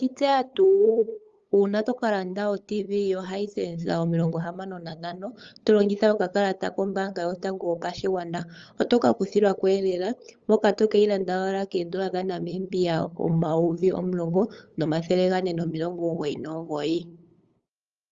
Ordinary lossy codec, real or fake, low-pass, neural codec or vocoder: Opus, 32 kbps; fake; 7.2 kHz; codec, 16 kHz, 8 kbps, FunCodec, trained on LibriTTS, 25 frames a second